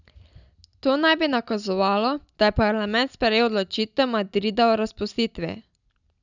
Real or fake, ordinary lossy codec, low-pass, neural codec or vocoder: real; none; 7.2 kHz; none